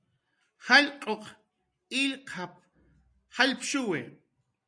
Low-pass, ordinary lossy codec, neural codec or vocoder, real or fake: 9.9 kHz; AAC, 64 kbps; none; real